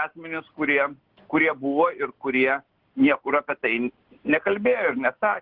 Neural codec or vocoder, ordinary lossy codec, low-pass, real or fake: none; Opus, 16 kbps; 5.4 kHz; real